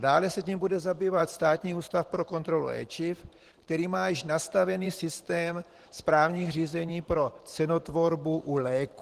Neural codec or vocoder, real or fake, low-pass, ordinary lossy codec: vocoder, 44.1 kHz, 128 mel bands every 512 samples, BigVGAN v2; fake; 14.4 kHz; Opus, 16 kbps